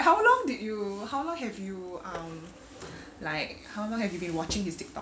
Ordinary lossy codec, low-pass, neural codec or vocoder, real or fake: none; none; none; real